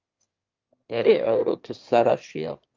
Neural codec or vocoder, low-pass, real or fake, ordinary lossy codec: autoencoder, 22.05 kHz, a latent of 192 numbers a frame, VITS, trained on one speaker; 7.2 kHz; fake; Opus, 24 kbps